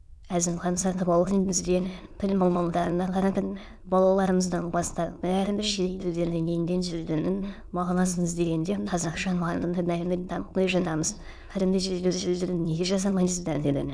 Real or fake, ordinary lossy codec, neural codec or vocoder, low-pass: fake; none; autoencoder, 22.05 kHz, a latent of 192 numbers a frame, VITS, trained on many speakers; none